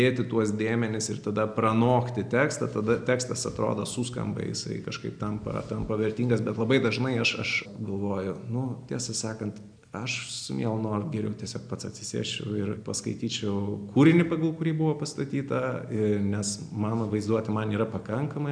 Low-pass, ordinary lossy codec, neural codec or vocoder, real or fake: 9.9 kHz; MP3, 96 kbps; none; real